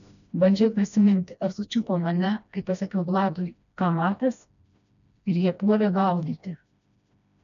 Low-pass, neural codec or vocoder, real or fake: 7.2 kHz; codec, 16 kHz, 1 kbps, FreqCodec, smaller model; fake